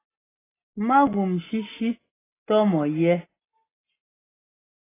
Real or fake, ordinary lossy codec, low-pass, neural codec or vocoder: real; AAC, 16 kbps; 3.6 kHz; none